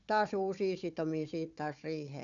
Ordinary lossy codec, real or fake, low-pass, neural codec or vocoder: none; fake; 7.2 kHz; codec, 16 kHz, 4 kbps, FunCodec, trained on Chinese and English, 50 frames a second